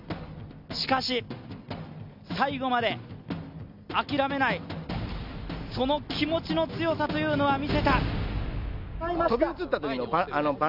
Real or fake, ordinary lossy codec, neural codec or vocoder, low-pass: real; none; none; 5.4 kHz